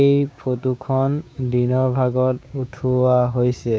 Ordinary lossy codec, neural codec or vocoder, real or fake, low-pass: none; codec, 16 kHz, 6 kbps, DAC; fake; none